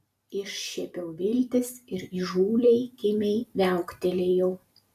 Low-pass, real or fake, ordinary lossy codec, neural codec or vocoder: 14.4 kHz; fake; MP3, 96 kbps; vocoder, 44.1 kHz, 128 mel bands every 256 samples, BigVGAN v2